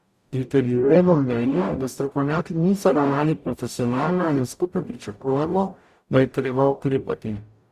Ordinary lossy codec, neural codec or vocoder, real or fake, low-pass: Opus, 64 kbps; codec, 44.1 kHz, 0.9 kbps, DAC; fake; 14.4 kHz